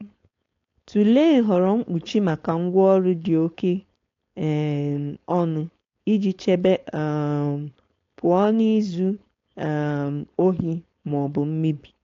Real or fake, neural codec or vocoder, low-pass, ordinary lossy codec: fake; codec, 16 kHz, 4.8 kbps, FACodec; 7.2 kHz; AAC, 48 kbps